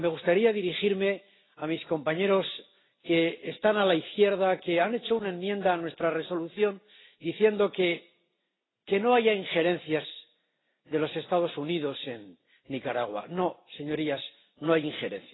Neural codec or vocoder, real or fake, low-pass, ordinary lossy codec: none; real; 7.2 kHz; AAC, 16 kbps